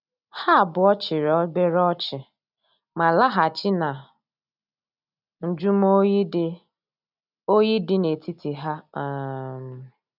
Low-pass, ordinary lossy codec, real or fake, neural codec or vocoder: 5.4 kHz; none; real; none